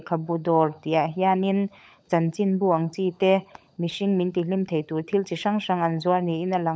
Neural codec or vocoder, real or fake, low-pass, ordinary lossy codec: codec, 16 kHz, 16 kbps, FunCodec, trained on LibriTTS, 50 frames a second; fake; none; none